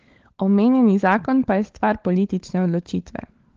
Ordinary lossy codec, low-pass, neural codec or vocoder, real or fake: Opus, 16 kbps; 7.2 kHz; codec, 16 kHz, 16 kbps, FunCodec, trained on LibriTTS, 50 frames a second; fake